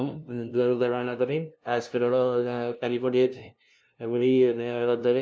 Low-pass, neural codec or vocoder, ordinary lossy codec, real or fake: none; codec, 16 kHz, 0.5 kbps, FunCodec, trained on LibriTTS, 25 frames a second; none; fake